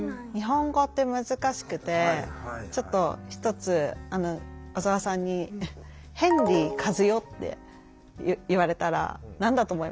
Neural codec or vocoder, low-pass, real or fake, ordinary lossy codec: none; none; real; none